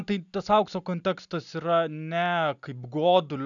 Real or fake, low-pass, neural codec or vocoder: real; 7.2 kHz; none